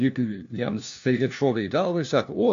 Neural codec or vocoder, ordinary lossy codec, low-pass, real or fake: codec, 16 kHz, 1 kbps, FunCodec, trained on LibriTTS, 50 frames a second; AAC, 64 kbps; 7.2 kHz; fake